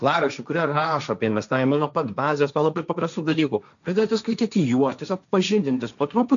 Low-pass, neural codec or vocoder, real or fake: 7.2 kHz; codec, 16 kHz, 1.1 kbps, Voila-Tokenizer; fake